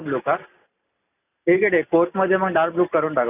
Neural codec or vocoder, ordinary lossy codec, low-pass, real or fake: none; none; 3.6 kHz; real